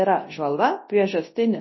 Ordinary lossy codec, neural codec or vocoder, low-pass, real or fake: MP3, 24 kbps; codec, 24 kHz, 0.9 kbps, WavTokenizer, large speech release; 7.2 kHz; fake